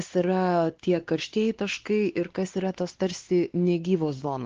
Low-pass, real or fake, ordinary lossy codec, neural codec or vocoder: 7.2 kHz; fake; Opus, 16 kbps; codec, 16 kHz, 2 kbps, X-Codec, WavLM features, trained on Multilingual LibriSpeech